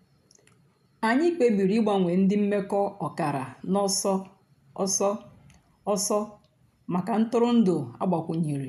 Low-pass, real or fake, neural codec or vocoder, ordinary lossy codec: 14.4 kHz; real; none; none